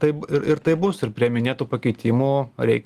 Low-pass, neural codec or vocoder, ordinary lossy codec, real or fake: 14.4 kHz; none; Opus, 24 kbps; real